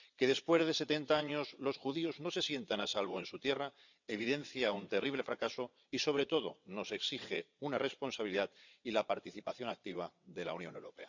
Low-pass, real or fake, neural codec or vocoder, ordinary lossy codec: 7.2 kHz; fake; vocoder, 22.05 kHz, 80 mel bands, WaveNeXt; none